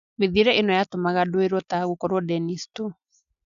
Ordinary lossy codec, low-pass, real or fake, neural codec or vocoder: none; 7.2 kHz; real; none